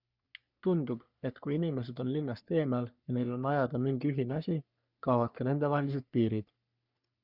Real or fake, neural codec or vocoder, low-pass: fake; codec, 44.1 kHz, 3.4 kbps, Pupu-Codec; 5.4 kHz